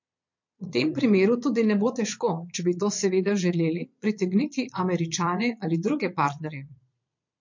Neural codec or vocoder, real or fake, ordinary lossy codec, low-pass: vocoder, 22.05 kHz, 80 mel bands, Vocos; fake; MP3, 48 kbps; 7.2 kHz